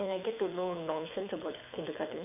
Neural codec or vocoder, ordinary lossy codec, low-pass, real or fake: codec, 24 kHz, 6 kbps, HILCodec; none; 3.6 kHz; fake